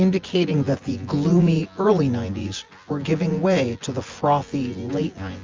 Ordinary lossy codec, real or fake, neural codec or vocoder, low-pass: Opus, 32 kbps; fake; vocoder, 24 kHz, 100 mel bands, Vocos; 7.2 kHz